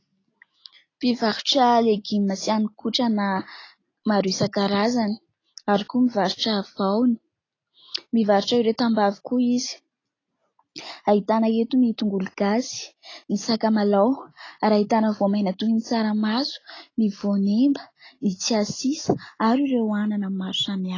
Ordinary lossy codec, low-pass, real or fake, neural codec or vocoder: AAC, 32 kbps; 7.2 kHz; real; none